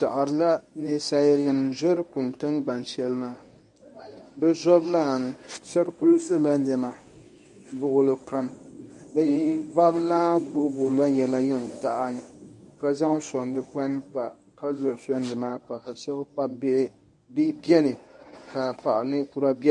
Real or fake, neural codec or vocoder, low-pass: fake; codec, 24 kHz, 0.9 kbps, WavTokenizer, medium speech release version 1; 10.8 kHz